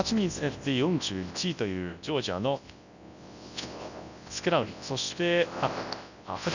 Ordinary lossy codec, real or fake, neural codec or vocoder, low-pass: none; fake; codec, 24 kHz, 0.9 kbps, WavTokenizer, large speech release; 7.2 kHz